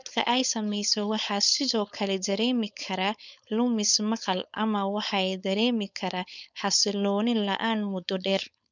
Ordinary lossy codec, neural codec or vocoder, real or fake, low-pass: none; codec, 16 kHz, 4.8 kbps, FACodec; fake; 7.2 kHz